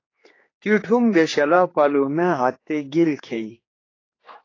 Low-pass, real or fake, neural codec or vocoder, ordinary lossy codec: 7.2 kHz; fake; codec, 16 kHz, 4 kbps, X-Codec, HuBERT features, trained on general audio; AAC, 32 kbps